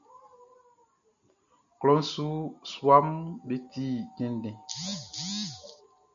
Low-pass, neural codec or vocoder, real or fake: 7.2 kHz; none; real